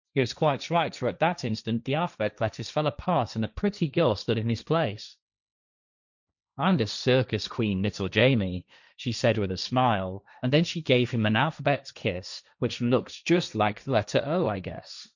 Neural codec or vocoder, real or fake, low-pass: codec, 16 kHz, 1.1 kbps, Voila-Tokenizer; fake; 7.2 kHz